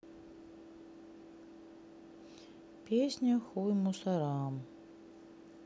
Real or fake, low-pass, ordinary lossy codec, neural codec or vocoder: real; none; none; none